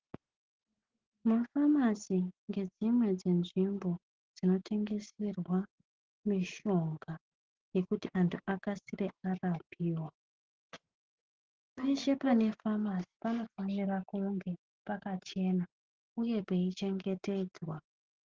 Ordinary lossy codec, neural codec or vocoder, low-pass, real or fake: Opus, 16 kbps; none; 7.2 kHz; real